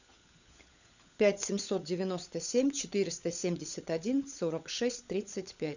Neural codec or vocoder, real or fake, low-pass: codec, 16 kHz, 16 kbps, FunCodec, trained on LibriTTS, 50 frames a second; fake; 7.2 kHz